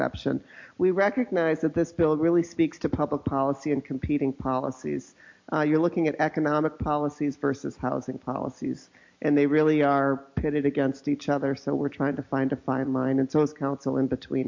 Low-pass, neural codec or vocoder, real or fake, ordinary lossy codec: 7.2 kHz; none; real; MP3, 48 kbps